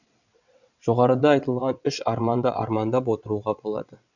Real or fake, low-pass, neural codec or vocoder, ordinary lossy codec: fake; 7.2 kHz; vocoder, 22.05 kHz, 80 mel bands, WaveNeXt; none